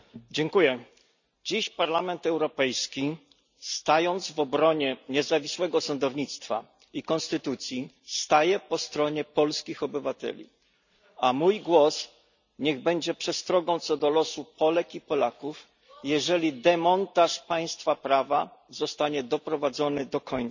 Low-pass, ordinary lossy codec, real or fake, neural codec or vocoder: 7.2 kHz; none; real; none